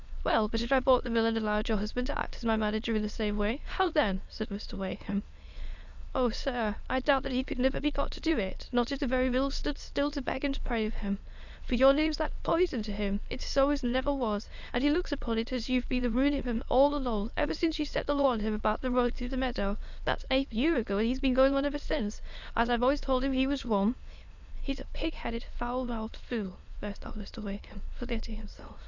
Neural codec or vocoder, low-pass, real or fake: autoencoder, 22.05 kHz, a latent of 192 numbers a frame, VITS, trained on many speakers; 7.2 kHz; fake